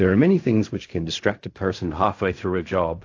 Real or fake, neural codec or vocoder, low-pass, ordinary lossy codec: fake; codec, 16 kHz in and 24 kHz out, 0.4 kbps, LongCat-Audio-Codec, fine tuned four codebook decoder; 7.2 kHz; AAC, 48 kbps